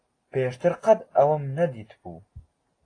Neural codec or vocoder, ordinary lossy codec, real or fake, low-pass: none; AAC, 32 kbps; real; 9.9 kHz